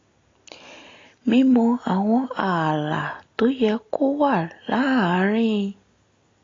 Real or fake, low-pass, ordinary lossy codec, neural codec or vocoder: real; 7.2 kHz; AAC, 32 kbps; none